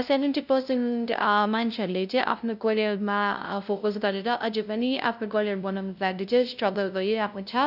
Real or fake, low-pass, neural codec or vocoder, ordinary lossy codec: fake; 5.4 kHz; codec, 16 kHz, 0.5 kbps, FunCodec, trained on LibriTTS, 25 frames a second; none